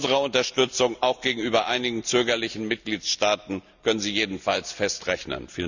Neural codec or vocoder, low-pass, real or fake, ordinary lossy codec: none; 7.2 kHz; real; none